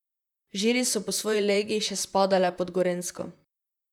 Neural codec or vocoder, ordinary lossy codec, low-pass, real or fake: vocoder, 44.1 kHz, 128 mel bands, Pupu-Vocoder; none; 19.8 kHz; fake